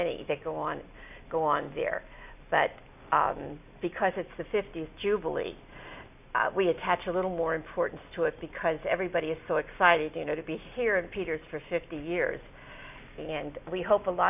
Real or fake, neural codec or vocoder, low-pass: real; none; 3.6 kHz